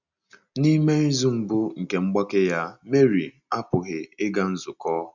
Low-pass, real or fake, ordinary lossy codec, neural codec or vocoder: 7.2 kHz; real; none; none